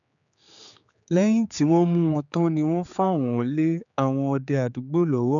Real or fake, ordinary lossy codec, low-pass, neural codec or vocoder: fake; none; 7.2 kHz; codec, 16 kHz, 4 kbps, X-Codec, HuBERT features, trained on general audio